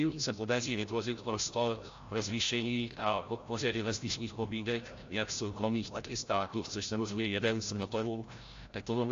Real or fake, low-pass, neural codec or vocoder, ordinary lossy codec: fake; 7.2 kHz; codec, 16 kHz, 0.5 kbps, FreqCodec, larger model; AAC, 48 kbps